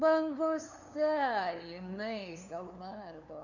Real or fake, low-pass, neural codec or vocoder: fake; 7.2 kHz; codec, 16 kHz, 2 kbps, FunCodec, trained on LibriTTS, 25 frames a second